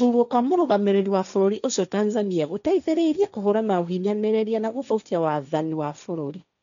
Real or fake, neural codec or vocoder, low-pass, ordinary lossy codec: fake; codec, 16 kHz, 1.1 kbps, Voila-Tokenizer; 7.2 kHz; none